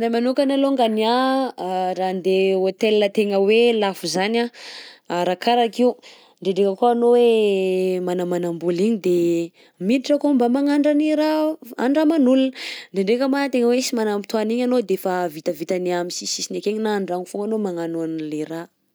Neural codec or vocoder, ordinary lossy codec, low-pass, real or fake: none; none; none; real